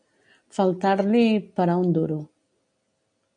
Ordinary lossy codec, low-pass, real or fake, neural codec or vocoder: MP3, 96 kbps; 9.9 kHz; real; none